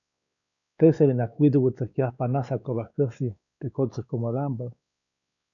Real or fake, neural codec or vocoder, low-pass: fake; codec, 16 kHz, 2 kbps, X-Codec, WavLM features, trained on Multilingual LibriSpeech; 7.2 kHz